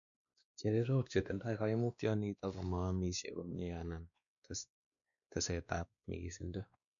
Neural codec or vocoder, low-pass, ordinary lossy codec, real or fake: codec, 16 kHz, 2 kbps, X-Codec, WavLM features, trained on Multilingual LibriSpeech; 7.2 kHz; MP3, 96 kbps; fake